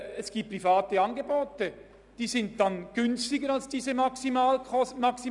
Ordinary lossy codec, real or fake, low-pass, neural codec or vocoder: none; real; 10.8 kHz; none